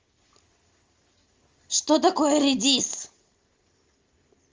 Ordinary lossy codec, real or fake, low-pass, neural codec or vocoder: Opus, 32 kbps; real; 7.2 kHz; none